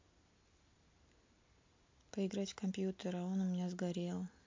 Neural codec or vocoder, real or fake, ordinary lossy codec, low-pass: none; real; MP3, 48 kbps; 7.2 kHz